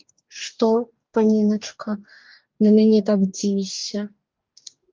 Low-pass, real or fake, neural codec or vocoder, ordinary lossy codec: 7.2 kHz; fake; codec, 44.1 kHz, 2.6 kbps, DAC; Opus, 24 kbps